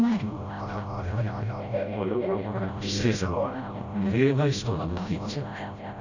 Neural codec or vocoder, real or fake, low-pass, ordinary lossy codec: codec, 16 kHz, 0.5 kbps, FreqCodec, smaller model; fake; 7.2 kHz; none